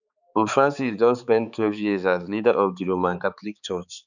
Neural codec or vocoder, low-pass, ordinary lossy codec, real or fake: codec, 16 kHz, 4 kbps, X-Codec, HuBERT features, trained on balanced general audio; 7.2 kHz; none; fake